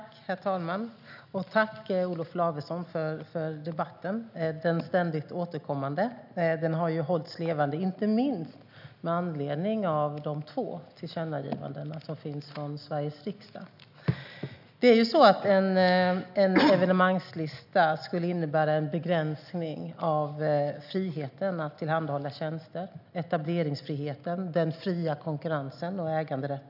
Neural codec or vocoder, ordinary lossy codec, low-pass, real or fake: none; none; 5.4 kHz; real